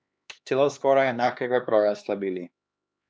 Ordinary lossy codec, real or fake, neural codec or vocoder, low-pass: none; fake; codec, 16 kHz, 2 kbps, X-Codec, HuBERT features, trained on LibriSpeech; none